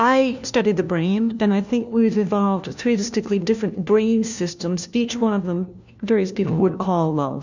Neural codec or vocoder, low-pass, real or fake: codec, 16 kHz, 1 kbps, FunCodec, trained on LibriTTS, 50 frames a second; 7.2 kHz; fake